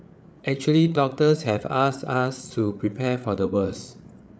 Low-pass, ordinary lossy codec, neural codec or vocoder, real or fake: none; none; codec, 16 kHz, 8 kbps, FreqCodec, larger model; fake